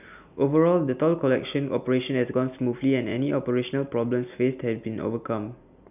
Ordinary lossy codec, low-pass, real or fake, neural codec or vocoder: none; 3.6 kHz; real; none